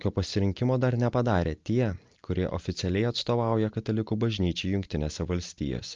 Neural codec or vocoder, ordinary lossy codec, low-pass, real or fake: none; Opus, 24 kbps; 7.2 kHz; real